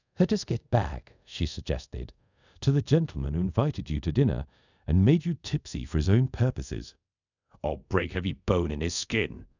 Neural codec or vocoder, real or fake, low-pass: codec, 24 kHz, 0.5 kbps, DualCodec; fake; 7.2 kHz